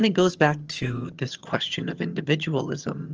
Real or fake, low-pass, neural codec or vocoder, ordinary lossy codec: fake; 7.2 kHz; vocoder, 22.05 kHz, 80 mel bands, HiFi-GAN; Opus, 24 kbps